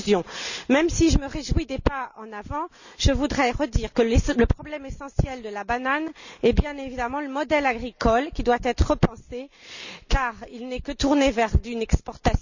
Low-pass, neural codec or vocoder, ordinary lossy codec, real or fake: 7.2 kHz; none; none; real